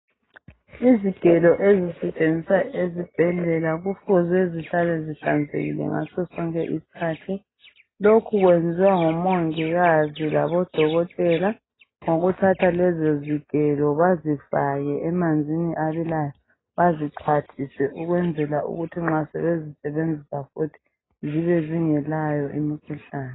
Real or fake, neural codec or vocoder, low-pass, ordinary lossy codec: real; none; 7.2 kHz; AAC, 16 kbps